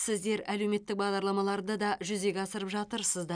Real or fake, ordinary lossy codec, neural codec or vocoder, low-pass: real; none; none; 9.9 kHz